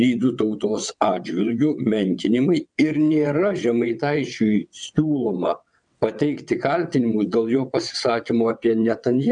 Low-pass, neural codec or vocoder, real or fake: 9.9 kHz; vocoder, 22.05 kHz, 80 mel bands, WaveNeXt; fake